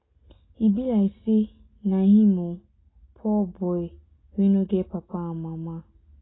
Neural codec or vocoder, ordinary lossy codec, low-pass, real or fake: none; AAC, 16 kbps; 7.2 kHz; real